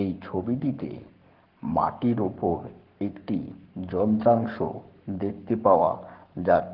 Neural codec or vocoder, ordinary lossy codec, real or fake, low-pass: codec, 44.1 kHz, 7.8 kbps, Pupu-Codec; Opus, 16 kbps; fake; 5.4 kHz